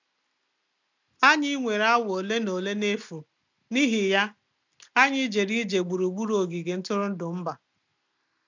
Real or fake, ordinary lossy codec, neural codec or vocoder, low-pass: real; none; none; 7.2 kHz